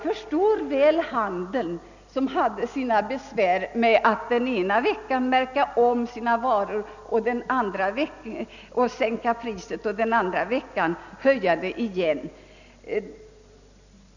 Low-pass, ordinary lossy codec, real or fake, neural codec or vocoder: 7.2 kHz; none; real; none